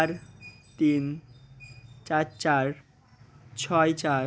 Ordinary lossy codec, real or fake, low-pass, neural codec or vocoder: none; real; none; none